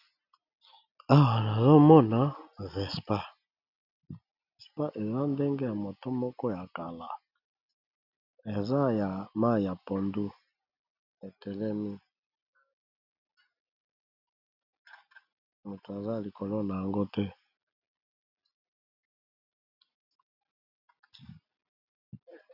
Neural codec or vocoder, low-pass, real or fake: none; 5.4 kHz; real